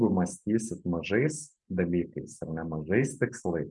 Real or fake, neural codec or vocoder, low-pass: real; none; 10.8 kHz